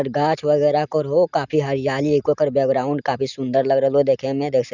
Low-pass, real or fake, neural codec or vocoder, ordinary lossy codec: 7.2 kHz; real; none; none